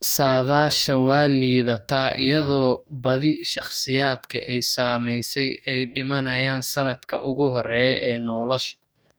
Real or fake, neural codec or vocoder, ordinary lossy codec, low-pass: fake; codec, 44.1 kHz, 2.6 kbps, DAC; none; none